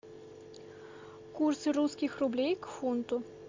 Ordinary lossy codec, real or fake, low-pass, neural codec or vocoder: MP3, 64 kbps; real; 7.2 kHz; none